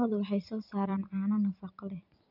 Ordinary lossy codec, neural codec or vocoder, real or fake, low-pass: none; vocoder, 44.1 kHz, 128 mel bands every 256 samples, BigVGAN v2; fake; 5.4 kHz